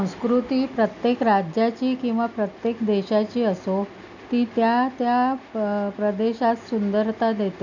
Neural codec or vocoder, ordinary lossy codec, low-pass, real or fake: none; none; 7.2 kHz; real